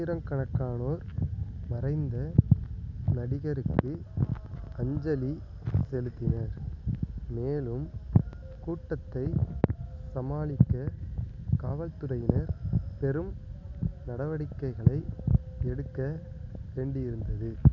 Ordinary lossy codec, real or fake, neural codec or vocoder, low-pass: none; real; none; 7.2 kHz